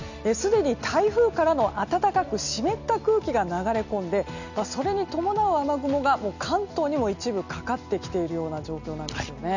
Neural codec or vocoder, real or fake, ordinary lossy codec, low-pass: none; real; none; 7.2 kHz